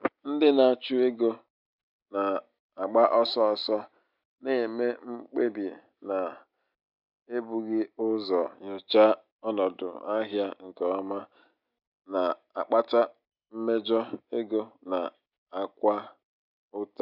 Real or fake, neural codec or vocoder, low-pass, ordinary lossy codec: real; none; 5.4 kHz; none